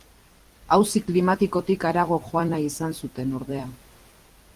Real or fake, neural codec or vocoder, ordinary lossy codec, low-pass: fake; vocoder, 44.1 kHz, 128 mel bands every 256 samples, BigVGAN v2; Opus, 24 kbps; 14.4 kHz